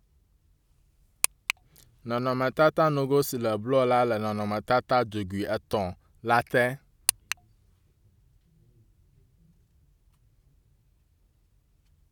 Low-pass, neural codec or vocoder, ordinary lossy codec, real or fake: 19.8 kHz; none; none; real